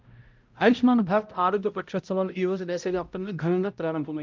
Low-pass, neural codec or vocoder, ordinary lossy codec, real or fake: 7.2 kHz; codec, 16 kHz, 0.5 kbps, X-Codec, HuBERT features, trained on balanced general audio; Opus, 24 kbps; fake